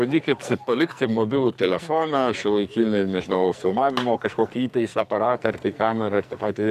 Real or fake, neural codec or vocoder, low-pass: fake; codec, 44.1 kHz, 2.6 kbps, SNAC; 14.4 kHz